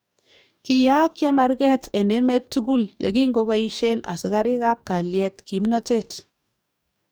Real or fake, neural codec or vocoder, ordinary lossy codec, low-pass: fake; codec, 44.1 kHz, 2.6 kbps, DAC; none; none